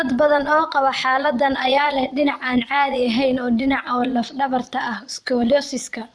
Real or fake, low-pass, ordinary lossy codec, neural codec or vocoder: fake; none; none; vocoder, 22.05 kHz, 80 mel bands, Vocos